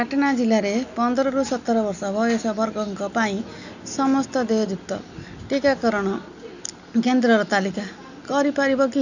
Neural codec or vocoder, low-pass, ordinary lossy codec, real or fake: none; 7.2 kHz; none; real